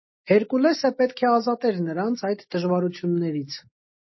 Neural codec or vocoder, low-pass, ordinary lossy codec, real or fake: none; 7.2 kHz; MP3, 24 kbps; real